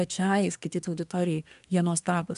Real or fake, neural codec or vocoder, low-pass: fake; codec, 24 kHz, 1 kbps, SNAC; 10.8 kHz